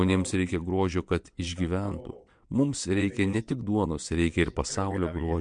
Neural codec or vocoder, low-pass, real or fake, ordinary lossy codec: vocoder, 22.05 kHz, 80 mel bands, WaveNeXt; 9.9 kHz; fake; MP3, 64 kbps